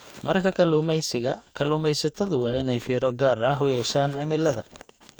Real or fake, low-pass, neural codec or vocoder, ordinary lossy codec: fake; none; codec, 44.1 kHz, 2.6 kbps, DAC; none